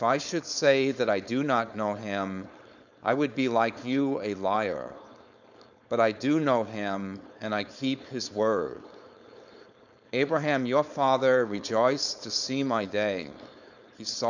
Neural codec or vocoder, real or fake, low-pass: codec, 16 kHz, 4.8 kbps, FACodec; fake; 7.2 kHz